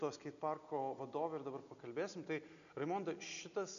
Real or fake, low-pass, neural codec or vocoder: real; 7.2 kHz; none